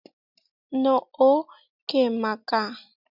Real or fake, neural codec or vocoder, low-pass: real; none; 5.4 kHz